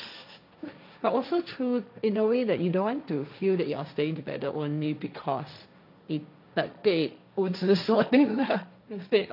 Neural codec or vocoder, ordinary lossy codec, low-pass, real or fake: codec, 16 kHz, 1.1 kbps, Voila-Tokenizer; none; 5.4 kHz; fake